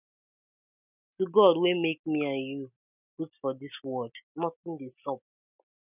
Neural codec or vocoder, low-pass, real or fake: none; 3.6 kHz; real